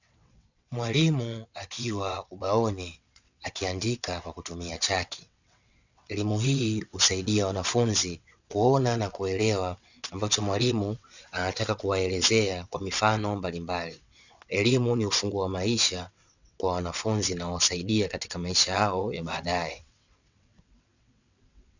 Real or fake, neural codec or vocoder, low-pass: fake; vocoder, 22.05 kHz, 80 mel bands, WaveNeXt; 7.2 kHz